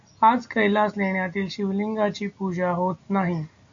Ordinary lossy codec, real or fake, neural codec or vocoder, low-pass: MP3, 64 kbps; real; none; 7.2 kHz